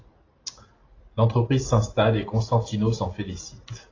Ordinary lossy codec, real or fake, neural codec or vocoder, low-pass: AAC, 32 kbps; real; none; 7.2 kHz